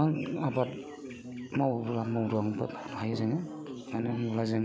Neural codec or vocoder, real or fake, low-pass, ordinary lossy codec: none; real; none; none